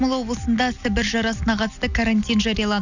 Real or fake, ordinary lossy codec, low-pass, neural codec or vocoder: real; none; 7.2 kHz; none